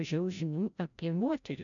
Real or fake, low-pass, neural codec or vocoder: fake; 7.2 kHz; codec, 16 kHz, 0.5 kbps, FreqCodec, larger model